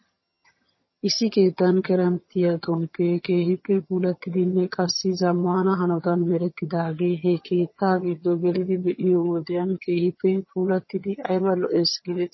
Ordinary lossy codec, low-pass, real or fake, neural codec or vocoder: MP3, 24 kbps; 7.2 kHz; fake; vocoder, 22.05 kHz, 80 mel bands, HiFi-GAN